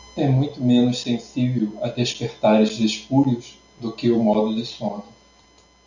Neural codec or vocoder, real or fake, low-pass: none; real; 7.2 kHz